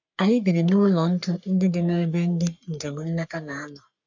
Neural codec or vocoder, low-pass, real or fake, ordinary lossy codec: codec, 44.1 kHz, 3.4 kbps, Pupu-Codec; 7.2 kHz; fake; none